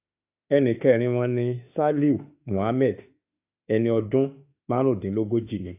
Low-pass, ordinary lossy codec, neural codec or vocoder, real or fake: 3.6 kHz; AAC, 32 kbps; autoencoder, 48 kHz, 32 numbers a frame, DAC-VAE, trained on Japanese speech; fake